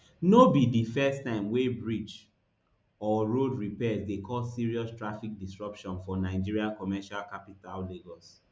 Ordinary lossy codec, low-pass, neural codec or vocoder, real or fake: none; none; none; real